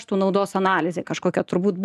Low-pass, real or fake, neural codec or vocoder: 14.4 kHz; real; none